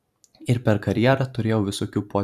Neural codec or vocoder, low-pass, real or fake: vocoder, 44.1 kHz, 128 mel bands every 256 samples, BigVGAN v2; 14.4 kHz; fake